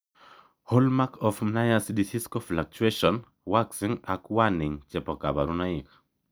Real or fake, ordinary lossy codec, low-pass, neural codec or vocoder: real; none; none; none